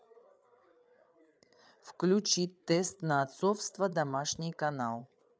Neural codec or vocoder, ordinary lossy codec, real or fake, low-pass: codec, 16 kHz, 8 kbps, FreqCodec, larger model; none; fake; none